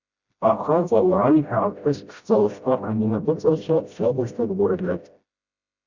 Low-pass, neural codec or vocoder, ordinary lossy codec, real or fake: 7.2 kHz; codec, 16 kHz, 0.5 kbps, FreqCodec, smaller model; Opus, 64 kbps; fake